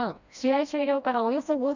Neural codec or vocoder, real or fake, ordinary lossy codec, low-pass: codec, 16 kHz, 1 kbps, FreqCodec, smaller model; fake; none; 7.2 kHz